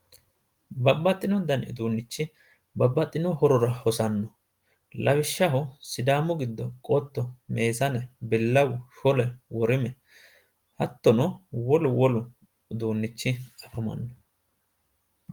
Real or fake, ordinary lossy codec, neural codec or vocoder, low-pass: real; Opus, 24 kbps; none; 19.8 kHz